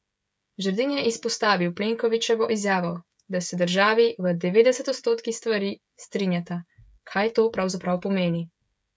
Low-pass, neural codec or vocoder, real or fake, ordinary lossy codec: none; codec, 16 kHz, 16 kbps, FreqCodec, smaller model; fake; none